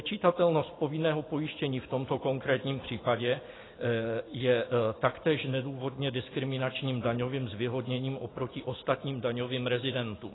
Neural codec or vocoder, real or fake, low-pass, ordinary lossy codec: none; real; 7.2 kHz; AAC, 16 kbps